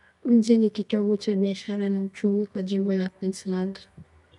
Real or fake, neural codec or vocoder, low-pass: fake; codec, 24 kHz, 0.9 kbps, WavTokenizer, medium music audio release; 10.8 kHz